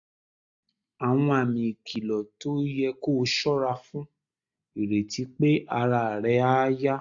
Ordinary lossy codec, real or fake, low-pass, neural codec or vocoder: AAC, 64 kbps; real; 7.2 kHz; none